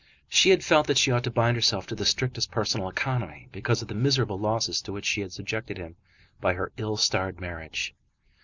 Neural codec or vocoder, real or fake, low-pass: none; real; 7.2 kHz